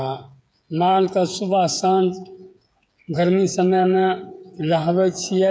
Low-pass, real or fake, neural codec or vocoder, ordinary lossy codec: none; fake; codec, 16 kHz, 8 kbps, FreqCodec, smaller model; none